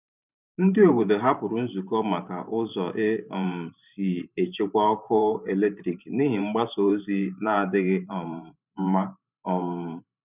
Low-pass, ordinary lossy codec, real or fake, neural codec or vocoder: 3.6 kHz; none; fake; vocoder, 44.1 kHz, 128 mel bands every 512 samples, BigVGAN v2